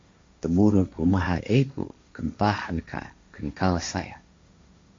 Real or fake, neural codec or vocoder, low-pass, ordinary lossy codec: fake; codec, 16 kHz, 1.1 kbps, Voila-Tokenizer; 7.2 kHz; MP3, 48 kbps